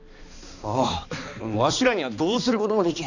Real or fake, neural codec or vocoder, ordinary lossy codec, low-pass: fake; codec, 16 kHz, 2 kbps, X-Codec, HuBERT features, trained on balanced general audio; none; 7.2 kHz